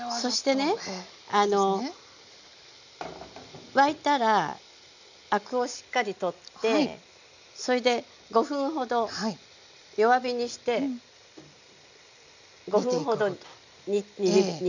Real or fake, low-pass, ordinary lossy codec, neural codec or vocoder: real; 7.2 kHz; none; none